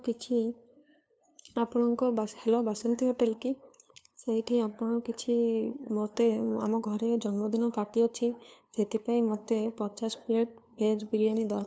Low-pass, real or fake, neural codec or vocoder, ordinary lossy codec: none; fake; codec, 16 kHz, 2 kbps, FunCodec, trained on LibriTTS, 25 frames a second; none